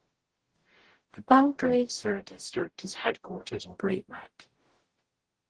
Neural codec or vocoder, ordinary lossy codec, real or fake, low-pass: codec, 44.1 kHz, 0.9 kbps, DAC; Opus, 16 kbps; fake; 9.9 kHz